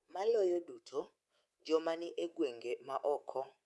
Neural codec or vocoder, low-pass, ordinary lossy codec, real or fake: codec, 24 kHz, 3.1 kbps, DualCodec; none; none; fake